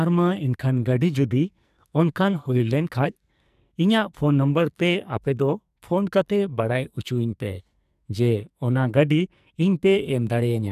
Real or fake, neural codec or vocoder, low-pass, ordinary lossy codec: fake; codec, 44.1 kHz, 2.6 kbps, SNAC; 14.4 kHz; none